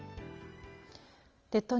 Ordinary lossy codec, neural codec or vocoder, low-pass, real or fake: Opus, 24 kbps; none; 7.2 kHz; real